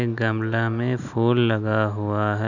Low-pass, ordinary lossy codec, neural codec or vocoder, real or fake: 7.2 kHz; none; none; real